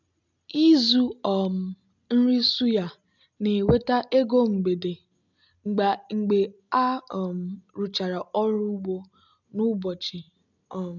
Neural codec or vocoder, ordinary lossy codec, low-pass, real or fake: none; none; 7.2 kHz; real